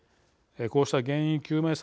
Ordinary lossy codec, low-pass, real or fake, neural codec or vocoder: none; none; real; none